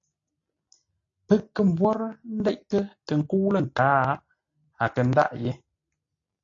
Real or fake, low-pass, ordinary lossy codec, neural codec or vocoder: real; 7.2 kHz; AAC, 48 kbps; none